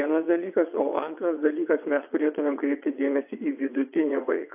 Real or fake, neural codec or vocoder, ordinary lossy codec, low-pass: fake; vocoder, 22.05 kHz, 80 mel bands, WaveNeXt; AAC, 32 kbps; 3.6 kHz